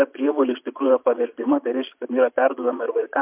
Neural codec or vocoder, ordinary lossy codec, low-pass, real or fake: vocoder, 22.05 kHz, 80 mel bands, Vocos; MP3, 32 kbps; 3.6 kHz; fake